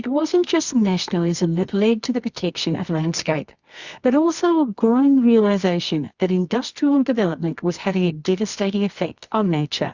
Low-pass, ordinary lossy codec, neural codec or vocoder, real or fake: 7.2 kHz; Opus, 64 kbps; codec, 24 kHz, 0.9 kbps, WavTokenizer, medium music audio release; fake